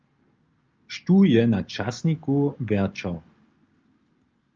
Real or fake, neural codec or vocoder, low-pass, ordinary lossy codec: real; none; 7.2 kHz; Opus, 24 kbps